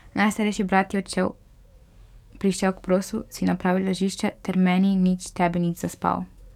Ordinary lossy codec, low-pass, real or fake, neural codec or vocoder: none; 19.8 kHz; fake; codec, 44.1 kHz, 7.8 kbps, DAC